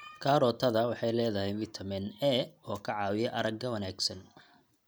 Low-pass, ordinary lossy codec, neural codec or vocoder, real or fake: none; none; none; real